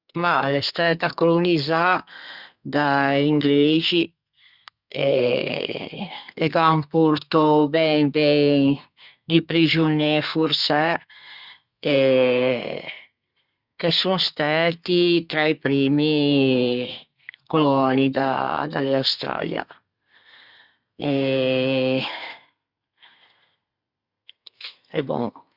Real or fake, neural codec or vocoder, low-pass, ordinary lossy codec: fake; codec, 32 kHz, 1.9 kbps, SNAC; 5.4 kHz; Opus, 64 kbps